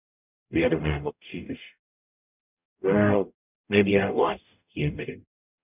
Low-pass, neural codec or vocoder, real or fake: 3.6 kHz; codec, 44.1 kHz, 0.9 kbps, DAC; fake